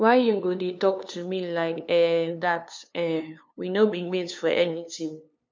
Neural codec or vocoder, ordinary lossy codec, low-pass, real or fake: codec, 16 kHz, 2 kbps, FunCodec, trained on LibriTTS, 25 frames a second; none; none; fake